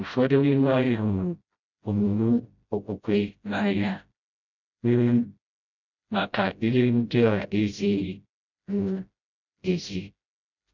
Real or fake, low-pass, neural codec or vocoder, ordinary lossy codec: fake; 7.2 kHz; codec, 16 kHz, 0.5 kbps, FreqCodec, smaller model; none